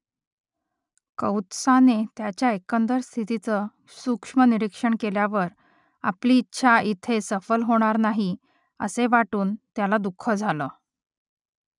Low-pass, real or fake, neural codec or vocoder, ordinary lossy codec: 10.8 kHz; real; none; none